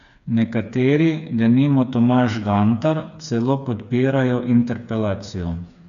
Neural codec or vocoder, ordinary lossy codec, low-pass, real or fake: codec, 16 kHz, 4 kbps, FreqCodec, smaller model; none; 7.2 kHz; fake